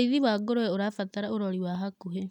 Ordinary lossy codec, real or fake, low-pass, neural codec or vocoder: none; real; 19.8 kHz; none